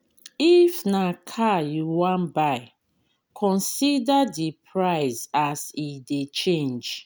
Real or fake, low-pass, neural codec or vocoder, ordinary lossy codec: real; none; none; none